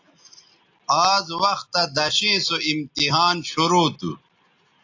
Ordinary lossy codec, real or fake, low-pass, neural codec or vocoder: AAC, 48 kbps; real; 7.2 kHz; none